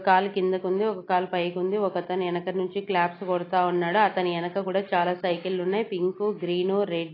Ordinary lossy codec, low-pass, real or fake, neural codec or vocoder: AAC, 24 kbps; 5.4 kHz; real; none